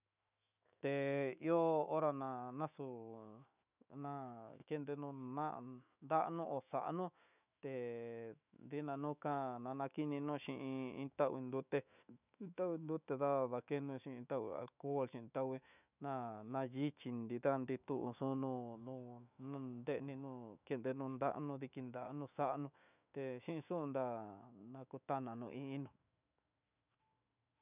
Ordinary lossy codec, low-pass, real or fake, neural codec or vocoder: none; 3.6 kHz; fake; autoencoder, 48 kHz, 128 numbers a frame, DAC-VAE, trained on Japanese speech